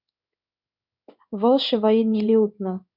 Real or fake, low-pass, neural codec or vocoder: fake; 5.4 kHz; codec, 16 kHz in and 24 kHz out, 1 kbps, XY-Tokenizer